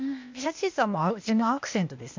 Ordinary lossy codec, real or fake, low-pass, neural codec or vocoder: MP3, 64 kbps; fake; 7.2 kHz; codec, 16 kHz, 0.8 kbps, ZipCodec